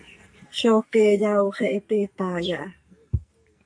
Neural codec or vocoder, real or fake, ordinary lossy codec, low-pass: codec, 44.1 kHz, 2.6 kbps, SNAC; fake; MP3, 64 kbps; 9.9 kHz